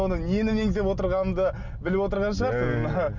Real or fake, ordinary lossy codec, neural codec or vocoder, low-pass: real; none; none; 7.2 kHz